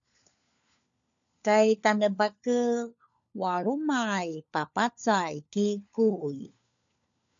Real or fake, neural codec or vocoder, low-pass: fake; codec, 16 kHz, 4 kbps, FunCodec, trained on LibriTTS, 50 frames a second; 7.2 kHz